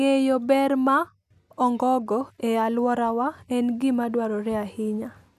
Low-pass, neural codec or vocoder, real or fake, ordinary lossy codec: 14.4 kHz; none; real; none